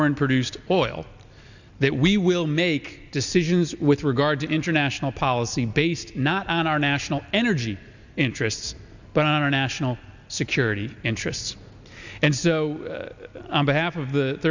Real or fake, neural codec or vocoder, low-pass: real; none; 7.2 kHz